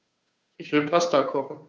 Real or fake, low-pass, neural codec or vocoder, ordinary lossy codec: fake; none; codec, 16 kHz, 2 kbps, FunCodec, trained on Chinese and English, 25 frames a second; none